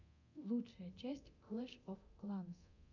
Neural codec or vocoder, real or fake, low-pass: codec, 24 kHz, 0.9 kbps, DualCodec; fake; 7.2 kHz